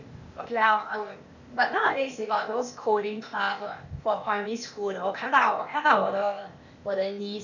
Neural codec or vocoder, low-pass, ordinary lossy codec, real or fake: codec, 16 kHz, 0.8 kbps, ZipCodec; 7.2 kHz; none; fake